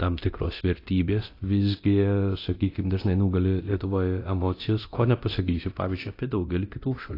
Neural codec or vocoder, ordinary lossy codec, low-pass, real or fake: codec, 24 kHz, 0.9 kbps, DualCodec; AAC, 32 kbps; 5.4 kHz; fake